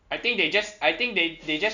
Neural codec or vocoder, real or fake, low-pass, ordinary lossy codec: none; real; 7.2 kHz; none